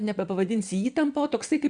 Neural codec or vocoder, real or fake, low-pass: vocoder, 22.05 kHz, 80 mel bands, WaveNeXt; fake; 9.9 kHz